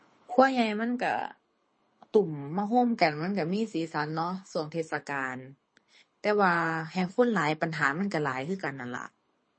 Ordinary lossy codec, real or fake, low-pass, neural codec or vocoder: MP3, 32 kbps; fake; 9.9 kHz; codec, 24 kHz, 6 kbps, HILCodec